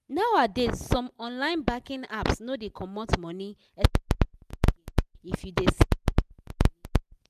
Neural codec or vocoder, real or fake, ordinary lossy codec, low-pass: none; real; none; 14.4 kHz